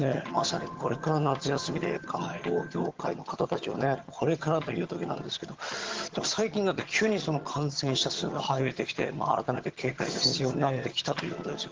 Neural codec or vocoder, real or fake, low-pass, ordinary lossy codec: vocoder, 22.05 kHz, 80 mel bands, HiFi-GAN; fake; 7.2 kHz; Opus, 16 kbps